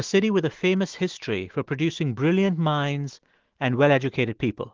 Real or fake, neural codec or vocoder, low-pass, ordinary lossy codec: fake; autoencoder, 48 kHz, 128 numbers a frame, DAC-VAE, trained on Japanese speech; 7.2 kHz; Opus, 16 kbps